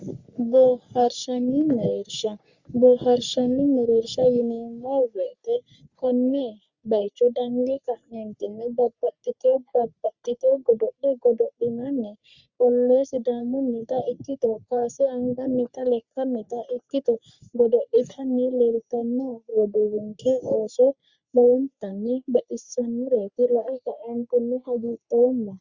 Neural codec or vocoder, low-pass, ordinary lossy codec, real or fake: codec, 44.1 kHz, 3.4 kbps, Pupu-Codec; 7.2 kHz; Opus, 64 kbps; fake